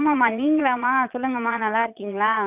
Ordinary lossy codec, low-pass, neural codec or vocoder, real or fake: none; 3.6 kHz; vocoder, 22.05 kHz, 80 mel bands, Vocos; fake